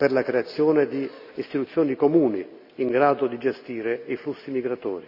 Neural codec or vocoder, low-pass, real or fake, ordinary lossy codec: none; 5.4 kHz; real; none